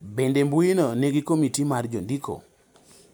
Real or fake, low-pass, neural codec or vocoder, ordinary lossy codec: real; none; none; none